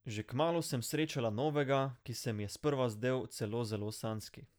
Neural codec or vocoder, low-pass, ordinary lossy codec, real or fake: none; none; none; real